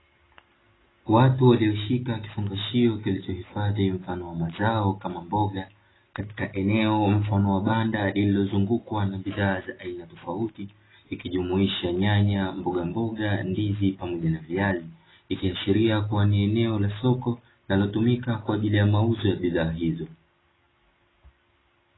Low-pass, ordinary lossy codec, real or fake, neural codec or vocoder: 7.2 kHz; AAC, 16 kbps; real; none